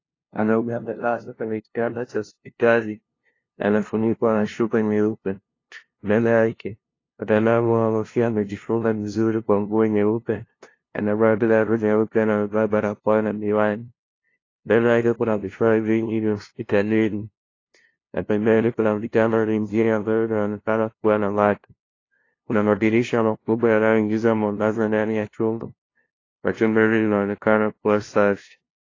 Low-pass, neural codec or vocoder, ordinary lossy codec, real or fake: 7.2 kHz; codec, 16 kHz, 0.5 kbps, FunCodec, trained on LibriTTS, 25 frames a second; AAC, 32 kbps; fake